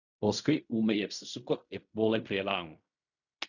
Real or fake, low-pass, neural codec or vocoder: fake; 7.2 kHz; codec, 16 kHz in and 24 kHz out, 0.4 kbps, LongCat-Audio-Codec, fine tuned four codebook decoder